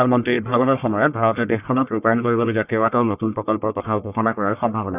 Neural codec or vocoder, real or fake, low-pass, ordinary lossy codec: codec, 44.1 kHz, 1.7 kbps, Pupu-Codec; fake; 3.6 kHz; none